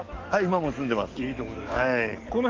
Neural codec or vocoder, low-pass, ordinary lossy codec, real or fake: codec, 44.1 kHz, 7.8 kbps, DAC; 7.2 kHz; Opus, 32 kbps; fake